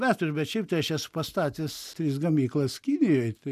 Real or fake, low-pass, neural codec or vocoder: real; 14.4 kHz; none